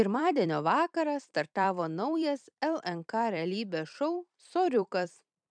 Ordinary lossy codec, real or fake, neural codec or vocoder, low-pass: MP3, 96 kbps; fake; vocoder, 44.1 kHz, 128 mel bands every 256 samples, BigVGAN v2; 9.9 kHz